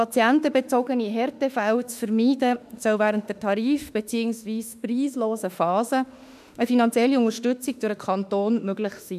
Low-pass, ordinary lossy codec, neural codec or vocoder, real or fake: 14.4 kHz; none; autoencoder, 48 kHz, 32 numbers a frame, DAC-VAE, trained on Japanese speech; fake